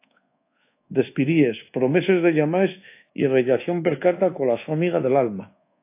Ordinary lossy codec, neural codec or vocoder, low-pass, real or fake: AAC, 24 kbps; codec, 24 kHz, 1.2 kbps, DualCodec; 3.6 kHz; fake